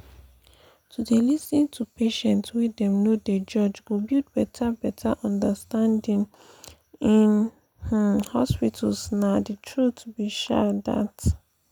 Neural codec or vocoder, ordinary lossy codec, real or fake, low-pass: none; none; real; 19.8 kHz